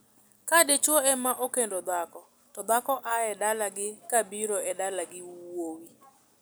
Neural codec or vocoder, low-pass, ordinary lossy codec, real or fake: none; none; none; real